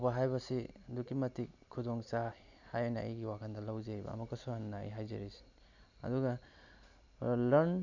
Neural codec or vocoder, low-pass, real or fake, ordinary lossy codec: none; 7.2 kHz; real; none